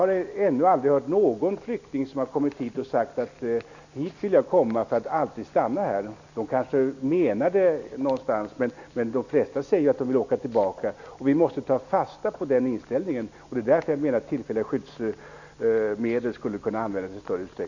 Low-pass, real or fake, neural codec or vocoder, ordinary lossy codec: 7.2 kHz; real; none; none